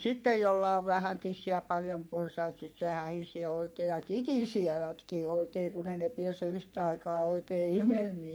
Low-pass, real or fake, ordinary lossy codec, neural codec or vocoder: none; fake; none; codec, 44.1 kHz, 3.4 kbps, Pupu-Codec